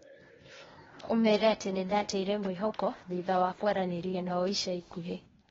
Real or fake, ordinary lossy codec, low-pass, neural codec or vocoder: fake; AAC, 24 kbps; 7.2 kHz; codec, 16 kHz, 0.8 kbps, ZipCodec